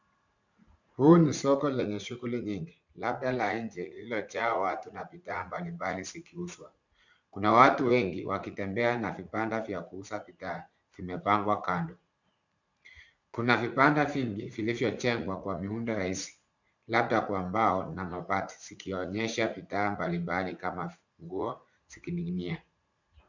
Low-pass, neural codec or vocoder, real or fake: 7.2 kHz; vocoder, 44.1 kHz, 80 mel bands, Vocos; fake